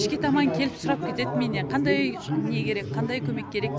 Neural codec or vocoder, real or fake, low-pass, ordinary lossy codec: none; real; none; none